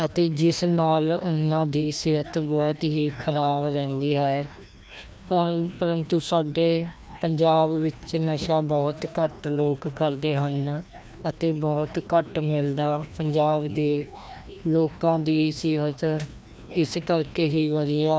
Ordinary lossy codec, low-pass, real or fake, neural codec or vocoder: none; none; fake; codec, 16 kHz, 1 kbps, FreqCodec, larger model